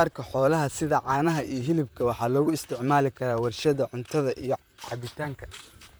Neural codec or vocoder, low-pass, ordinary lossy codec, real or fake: vocoder, 44.1 kHz, 128 mel bands, Pupu-Vocoder; none; none; fake